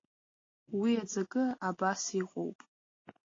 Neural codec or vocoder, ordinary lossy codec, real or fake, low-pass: none; AAC, 32 kbps; real; 7.2 kHz